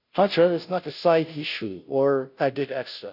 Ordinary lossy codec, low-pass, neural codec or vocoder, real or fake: none; 5.4 kHz; codec, 16 kHz, 0.5 kbps, FunCodec, trained on Chinese and English, 25 frames a second; fake